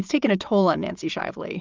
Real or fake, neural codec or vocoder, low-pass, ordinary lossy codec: fake; vocoder, 22.05 kHz, 80 mel bands, Vocos; 7.2 kHz; Opus, 24 kbps